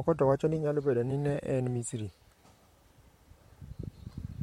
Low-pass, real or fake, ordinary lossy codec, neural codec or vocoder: 19.8 kHz; fake; MP3, 64 kbps; vocoder, 44.1 kHz, 128 mel bands, Pupu-Vocoder